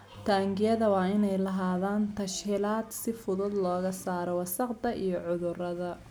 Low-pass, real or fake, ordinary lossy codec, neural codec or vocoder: none; real; none; none